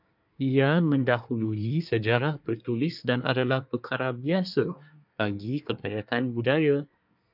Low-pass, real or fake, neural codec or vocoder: 5.4 kHz; fake; codec, 24 kHz, 1 kbps, SNAC